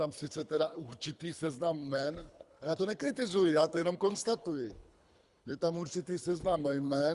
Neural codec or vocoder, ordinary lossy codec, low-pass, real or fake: codec, 24 kHz, 3 kbps, HILCodec; Opus, 64 kbps; 10.8 kHz; fake